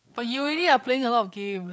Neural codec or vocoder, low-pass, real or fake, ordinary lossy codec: codec, 16 kHz, 8 kbps, FreqCodec, larger model; none; fake; none